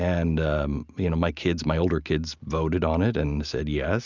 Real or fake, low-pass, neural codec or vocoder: real; 7.2 kHz; none